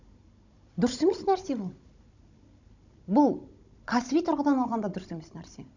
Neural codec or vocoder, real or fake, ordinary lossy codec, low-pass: codec, 16 kHz, 16 kbps, FunCodec, trained on Chinese and English, 50 frames a second; fake; none; 7.2 kHz